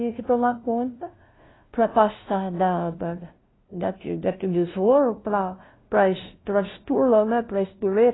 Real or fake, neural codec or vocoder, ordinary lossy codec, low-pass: fake; codec, 16 kHz, 0.5 kbps, FunCodec, trained on LibriTTS, 25 frames a second; AAC, 16 kbps; 7.2 kHz